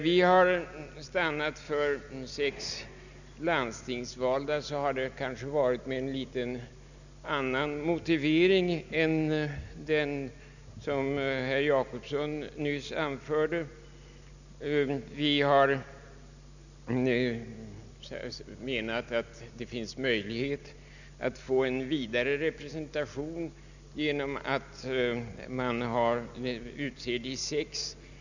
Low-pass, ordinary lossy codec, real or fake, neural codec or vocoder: 7.2 kHz; none; real; none